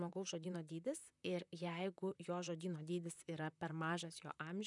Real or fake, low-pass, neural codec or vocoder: fake; 10.8 kHz; vocoder, 44.1 kHz, 128 mel bands, Pupu-Vocoder